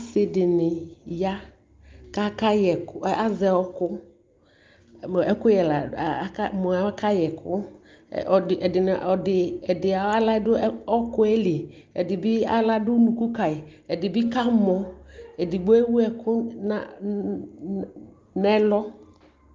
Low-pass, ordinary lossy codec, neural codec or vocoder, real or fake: 7.2 kHz; Opus, 32 kbps; none; real